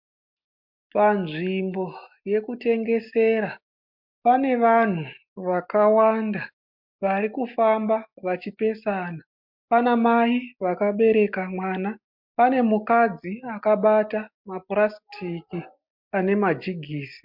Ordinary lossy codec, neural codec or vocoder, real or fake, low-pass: MP3, 48 kbps; none; real; 5.4 kHz